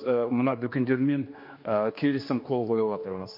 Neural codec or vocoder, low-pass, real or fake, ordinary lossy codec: codec, 16 kHz, 2 kbps, X-Codec, HuBERT features, trained on general audio; 5.4 kHz; fake; MP3, 32 kbps